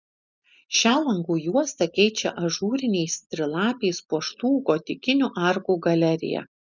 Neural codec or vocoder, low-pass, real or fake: none; 7.2 kHz; real